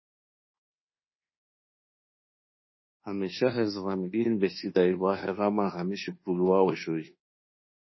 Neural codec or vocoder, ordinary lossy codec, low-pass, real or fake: codec, 24 kHz, 1.2 kbps, DualCodec; MP3, 24 kbps; 7.2 kHz; fake